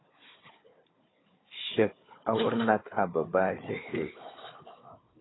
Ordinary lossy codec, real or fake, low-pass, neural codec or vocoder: AAC, 16 kbps; fake; 7.2 kHz; codec, 16 kHz, 4 kbps, FunCodec, trained on Chinese and English, 50 frames a second